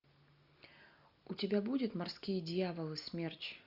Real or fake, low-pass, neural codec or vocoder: real; 5.4 kHz; none